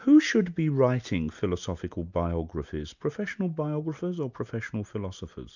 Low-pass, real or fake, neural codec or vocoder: 7.2 kHz; real; none